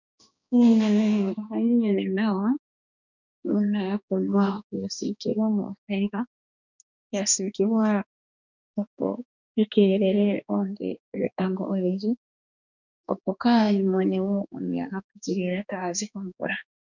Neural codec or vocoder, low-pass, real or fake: codec, 16 kHz, 2 kbps, X-Codec, HuBERT features, trained on balanced general audio; 7.2 kHz; fake